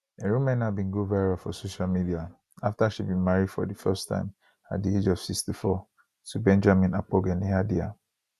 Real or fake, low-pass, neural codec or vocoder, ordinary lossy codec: real; 14.4 kHz; none; none